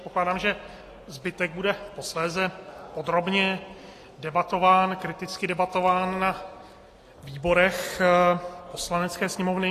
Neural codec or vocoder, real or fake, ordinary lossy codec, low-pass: none; real; AAC, 48 kbps; 14.4 kHz